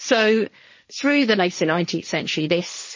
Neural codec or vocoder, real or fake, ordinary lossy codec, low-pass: codec, 16 kHz, 1.1 kbps, Voila-Tokenizer; fake; MP3, 32 kbps; 7.2 kHz